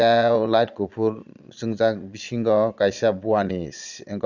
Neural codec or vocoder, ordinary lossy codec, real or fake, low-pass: vocoder, 22.05 kHz, 80 mel bands, Vocos; none; fake; 7.2 kHz